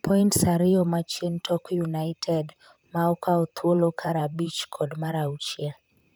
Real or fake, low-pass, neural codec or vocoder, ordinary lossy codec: fake; none; vocoder, 44.1 kHz, 128 mel bands, Pupu-Vocoder; none